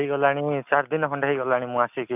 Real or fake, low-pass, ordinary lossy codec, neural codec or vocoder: real; 3.6 kHz; none; none